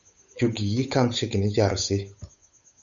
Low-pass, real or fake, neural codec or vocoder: 7.2 kHz; fake; codec, 16 kHz, 8 kbps, FunCodec, trained on Chinese and English, 25 frames a second